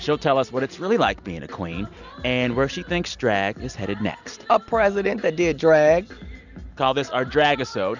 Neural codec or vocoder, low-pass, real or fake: none; 7.2 kHz; real